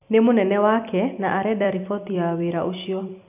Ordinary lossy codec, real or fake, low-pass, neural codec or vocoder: none; fake; 3.6 kHz; vocoder, 44.1 kHz, 128 mel bands every 256 samples, BigVGAN v2